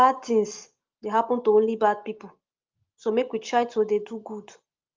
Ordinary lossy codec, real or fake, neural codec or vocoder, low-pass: Opus, 32 kbps; real; none; 7.2 kHz